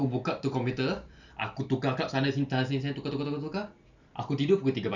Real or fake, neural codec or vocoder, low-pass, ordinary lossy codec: real; none; 7.2 kHz; none